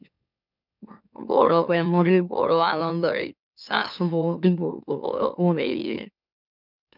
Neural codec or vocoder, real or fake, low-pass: autoencoder, 44.1 kHz, a latent of 192 numbers a frame, MeloTTS; fake; 5.4 kHz